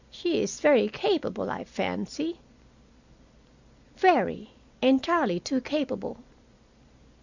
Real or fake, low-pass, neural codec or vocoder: real; 7.2 kHz; none